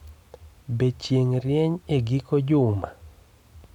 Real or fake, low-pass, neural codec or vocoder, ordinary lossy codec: real; 19.8 kHz; none; none